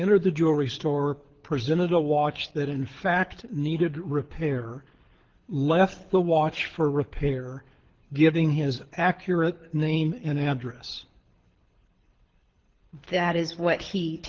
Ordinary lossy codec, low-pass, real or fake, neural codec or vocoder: Opus, 16 kbps; 7.2 kHz; fake; codec, 24 kHz, 6 kbps, HILCodec